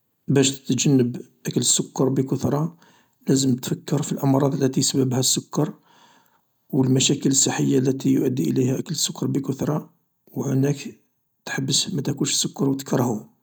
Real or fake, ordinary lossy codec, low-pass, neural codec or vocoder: real; none; none; none